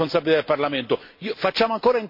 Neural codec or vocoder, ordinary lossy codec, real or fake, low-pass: none; MP3, 32 kbps; real; 5.4 kHz